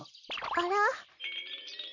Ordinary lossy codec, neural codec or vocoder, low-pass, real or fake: none; none; 7.2 kHz; real